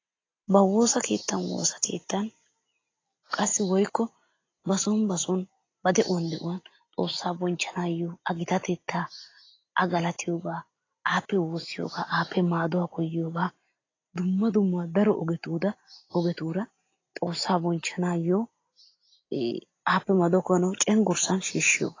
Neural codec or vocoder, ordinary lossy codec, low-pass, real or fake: none; AAC, 32 kbps; 7.2 kHz; real